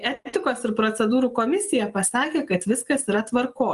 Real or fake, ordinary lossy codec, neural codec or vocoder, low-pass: real; Opus, 32 kbps; none; 10.8 kHz